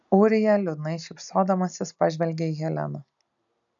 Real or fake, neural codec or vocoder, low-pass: real; none; 7.2 kHz